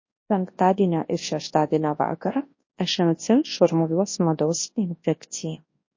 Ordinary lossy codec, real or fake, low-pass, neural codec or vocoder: MP3, 32 kbps; fake; 7.2 kHz; codec, 24 kHz, 0.9 kbps, WavTokenizer, large speech release